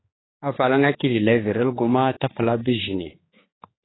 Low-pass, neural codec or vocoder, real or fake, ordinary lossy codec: 7.2 kHz; codec, 16 kHz, 4 kbps, X-Codec, HuBERT features, trained on general audio; fake; AAC, 16 kbps